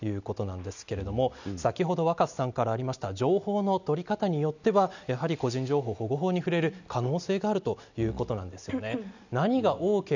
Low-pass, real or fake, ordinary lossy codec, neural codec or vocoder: 7.2 kHz; real; none; none